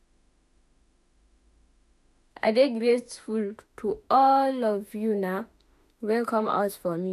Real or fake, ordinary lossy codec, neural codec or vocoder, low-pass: fake; none; autoencoder, 48 kHz, 32 numbers a frame, DAC-VAE, trained on Japanese speech; 14.4 kHz